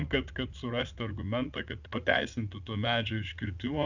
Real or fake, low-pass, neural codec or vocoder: fake; 7.2 kHz; vocoder, 44.1 kHz, 80 mel bands, Vocos